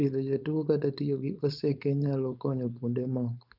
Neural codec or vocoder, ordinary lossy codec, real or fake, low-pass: codec, 16 kHz, 4.8 kbps, FACodec; none; fake; 5.4 kHz